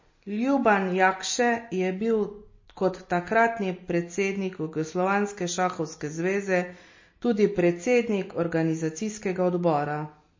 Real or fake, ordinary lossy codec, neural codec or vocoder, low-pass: real; MP3, 32 kbps; none; 7.2 kHz